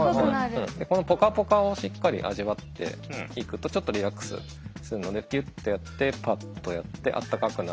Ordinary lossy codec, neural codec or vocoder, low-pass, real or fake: none; none; none; real